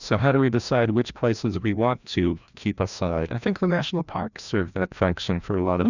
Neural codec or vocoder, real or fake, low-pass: codec, 16 kHz, 1 kbps, FreqCodec, larger model; fake; 7.2 kHz